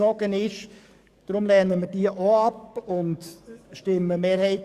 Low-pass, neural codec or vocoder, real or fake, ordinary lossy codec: 14.4 kHz; codec, 44.1 kHz, 7.8 kbps, DAC; fake; Opus, 64 kbps